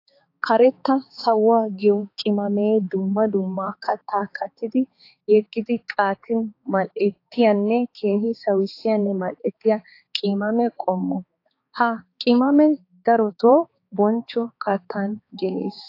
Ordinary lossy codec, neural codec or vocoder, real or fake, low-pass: AAC, 32 kbps; codec, 16 kHz, 4 kbps, X-Codec, HuBERT features, trained on balanced general audio; fake; 5.4 kHz